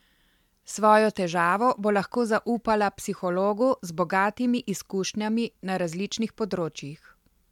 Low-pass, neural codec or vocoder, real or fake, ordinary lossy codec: 19.8 kHz; none; real; MP3, 96 kbps